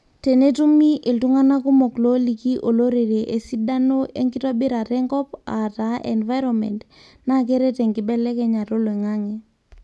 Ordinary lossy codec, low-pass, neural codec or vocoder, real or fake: none; none; none; real